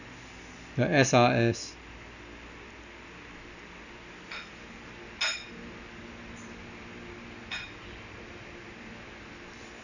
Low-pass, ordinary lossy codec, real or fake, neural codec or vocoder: 7.2 kHz; none; real; none